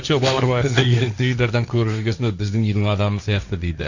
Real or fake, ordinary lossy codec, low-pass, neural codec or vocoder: fake; none; 7.2 kHz; codec, 16 kHz, 1.1 kbps, Voila-Tokenizer